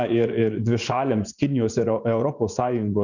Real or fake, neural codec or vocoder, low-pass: fake; vocoder, 24 kHz, 100 mel bands, Vocos; 7.2 kHz